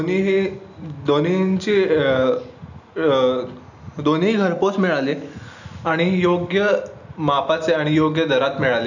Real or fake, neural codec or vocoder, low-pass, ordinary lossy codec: real; none; 7.2 kHz; none